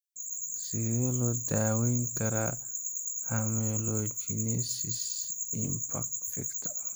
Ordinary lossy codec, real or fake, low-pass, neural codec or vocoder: none; real; none; none